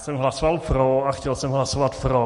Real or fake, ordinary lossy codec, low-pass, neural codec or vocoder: real; MP3, 48 kbps; 14.4 kHz; none